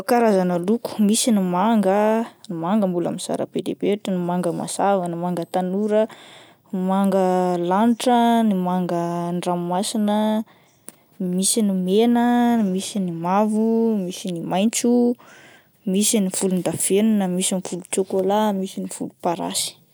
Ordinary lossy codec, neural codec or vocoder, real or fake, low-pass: none; none; real; none